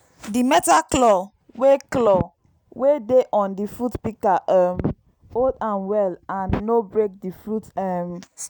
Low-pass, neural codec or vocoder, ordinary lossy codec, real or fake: none; none; none; real